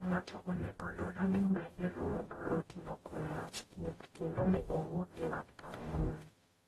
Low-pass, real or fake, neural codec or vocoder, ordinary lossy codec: 19.8 kHz; fake; codec, 44.1 kHz, 0.9 kbps, DAC; AAC, 32 kbps